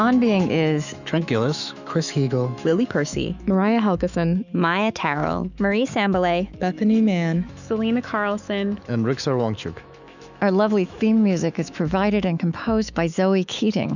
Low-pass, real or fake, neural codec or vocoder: 7.2 kHz; fake; autoencoder, 48 kHz, 128 numbers a frame, DAC-VAE, trained on Japanese speech